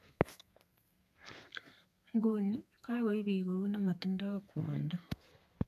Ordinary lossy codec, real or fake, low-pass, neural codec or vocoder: none; fake; 14.4 kHz; codec, 32 kHz, 1.9 kbps, SNAC